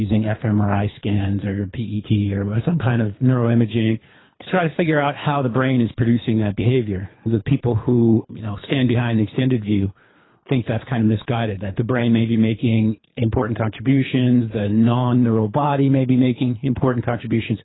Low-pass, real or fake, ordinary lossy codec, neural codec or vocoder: 7.2 kHz; fake; AAC, 16 kbps; codec, 24 kHz, 3 kbps, HILCodec